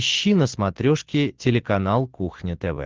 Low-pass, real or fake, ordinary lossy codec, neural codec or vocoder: 7.2 kHz; real; Opus, 16 kbps; none